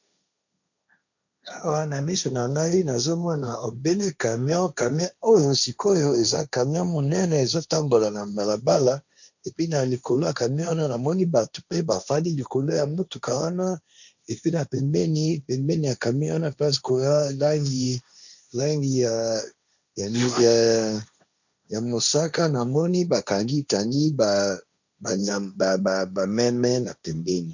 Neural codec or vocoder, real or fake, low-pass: codec, 16 kHz, 1.1 kbps, Voila-Tokenizer; fake; 7.2 kHz